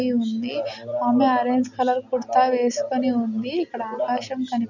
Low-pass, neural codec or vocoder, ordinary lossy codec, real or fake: 7.2 kHz; none; none; real